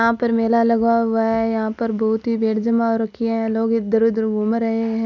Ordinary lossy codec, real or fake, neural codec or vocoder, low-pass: none; real; none; 7.2 kHz